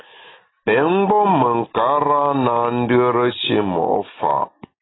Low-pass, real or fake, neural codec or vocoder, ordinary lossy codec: 7.2 kHz; real; none; AAC, 16 kbps